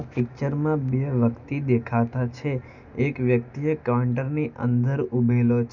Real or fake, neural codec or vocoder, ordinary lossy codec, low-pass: real; none; none; 7.2 kHz